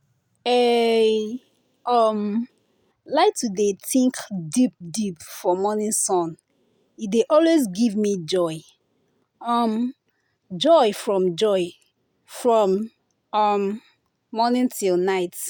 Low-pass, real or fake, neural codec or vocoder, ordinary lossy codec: none; real; none; none